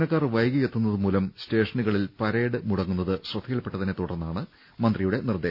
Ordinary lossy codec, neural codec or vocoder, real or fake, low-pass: none; none; real; 5.4 kHz